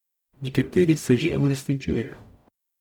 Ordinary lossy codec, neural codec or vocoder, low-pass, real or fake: none; codec, 44.1 kHz, 0.9 kbps, DAC; 19.8 kHz; fake